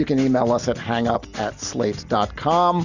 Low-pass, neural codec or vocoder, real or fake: 7.2 kHz; none; real